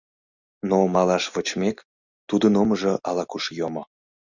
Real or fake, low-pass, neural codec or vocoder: real; 7.2 kHz; none